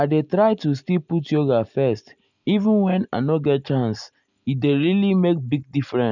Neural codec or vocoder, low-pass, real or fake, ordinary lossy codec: none; 7.2 kHz; real; none